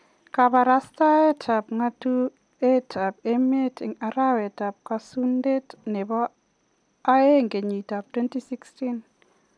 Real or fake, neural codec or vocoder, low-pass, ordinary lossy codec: real; none; 9.9 kHz; none